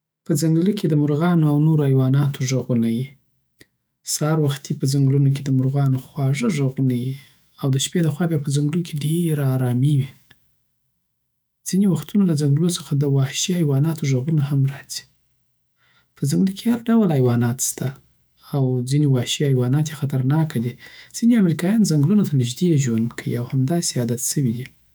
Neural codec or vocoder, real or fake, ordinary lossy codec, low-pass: autoencoder, 48 kHz, 128 numbers a frame, DAC-VAE, trained on Japanese speech; fake; none; none